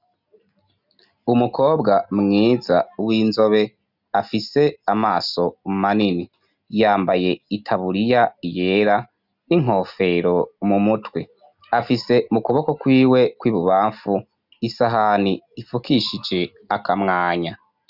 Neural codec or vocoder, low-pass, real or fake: none; 5.4 kHz; real